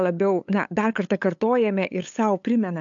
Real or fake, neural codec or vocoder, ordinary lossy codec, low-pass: fake; codec, 16 kHz, 8 kbps, FunCodec, trained on LibriTTS, 25 frames a second; AAC, 64 kbps; 7.2 kHz